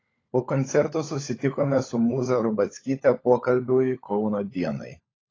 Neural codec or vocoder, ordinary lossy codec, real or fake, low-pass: codec, 16 kHz, 16 kbps, FunCodec, trained on LibriTTS, 50 frames a second; AAC, 32 kbps; fake; 7.2 kHz